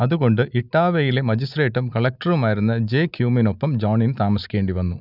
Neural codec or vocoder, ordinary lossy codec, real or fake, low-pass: none; none; real; 5.4 kHz